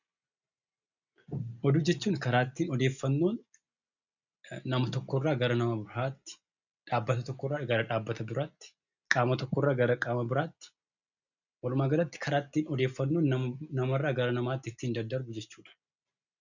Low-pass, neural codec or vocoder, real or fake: 7.2 kHz; none; real